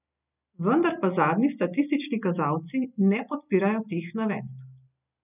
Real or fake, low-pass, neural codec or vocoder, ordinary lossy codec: real; 3.6 kHz; none; none